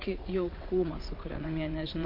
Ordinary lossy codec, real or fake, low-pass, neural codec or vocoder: MP3, 32 kbps; fake; 5.4 kHz; vocoder, 22.05 kHz, 80 mel bands, WaveNeXt